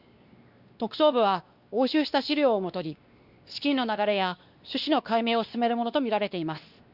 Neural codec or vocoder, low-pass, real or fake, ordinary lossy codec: codec, 16 kHz, 2 kbps, X-Codec, WavLM features, trained on Multilingual LibriSpeech; 5.4 kHz; fake; Opus, 64 kbps